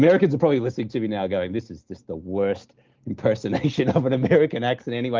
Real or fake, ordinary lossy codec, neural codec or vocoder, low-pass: real; Opus, 32 kbps; none; 7.2 kHz